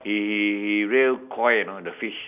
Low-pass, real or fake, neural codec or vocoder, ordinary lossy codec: 3.6 kHz; real; none; none